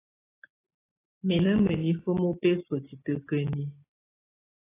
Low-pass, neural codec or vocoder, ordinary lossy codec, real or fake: 3.6 kHz; none; AAC, 16 kbps; real